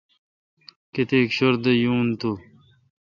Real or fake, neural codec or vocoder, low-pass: real; none; 7.2 kHz